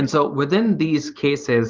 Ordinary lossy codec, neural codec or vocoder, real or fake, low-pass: Opus, 24 kbps; vocoder, 44.1 kHz, 128 mel bands every 512 samples, BigVGAN v2; fake; 7.2 kHz